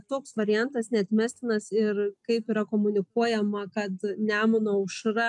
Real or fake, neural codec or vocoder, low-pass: real; none; 10.8 kHz